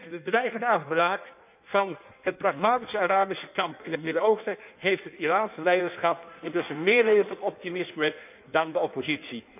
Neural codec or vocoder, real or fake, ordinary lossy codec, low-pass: codec, 16 kHz in and 24 kHz out, 1.1 kbps, FireRedTTS-2 codec; fake; none; 3.6 kHz